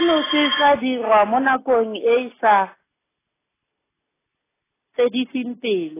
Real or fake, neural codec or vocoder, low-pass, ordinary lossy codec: real; none; 3.6 kHz; AAC, 16 kbps